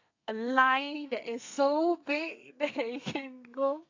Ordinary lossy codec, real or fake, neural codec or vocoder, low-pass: AAC, 48 kbps; fake; codec, 32 kHz, 1.9 kbps, SNAC; 7.2 kHz